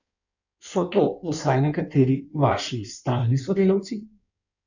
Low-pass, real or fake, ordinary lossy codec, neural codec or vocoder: 7.2 kHz; fake; none; codec, 16 kHz in and 24 kHz out, 1.1 kbps, FireRedTTS-2 codec